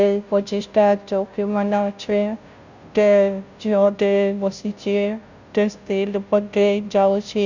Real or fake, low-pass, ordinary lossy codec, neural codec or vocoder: fake; 7.2 kHz; Opus, 64 kbps; codec, 16 kHz, 0.5 kbps, FunCodec, trained on Chinese and English, 25 frames a second